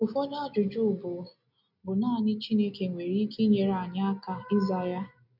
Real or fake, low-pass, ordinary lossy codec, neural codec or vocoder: real; 5.4 kHz; none; none